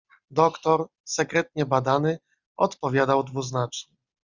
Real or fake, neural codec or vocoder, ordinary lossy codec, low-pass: real; none; Opus, 64 kbps; 7.2 kHz